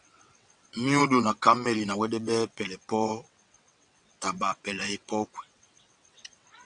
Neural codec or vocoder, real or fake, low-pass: vocoder, 22.05 kHz, 80 mel bands, WaveNeXt; fake; 9.9 kHz